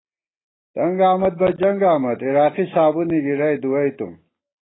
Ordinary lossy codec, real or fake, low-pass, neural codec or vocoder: AAC, 16 kbps; real; 7.2 kHz; none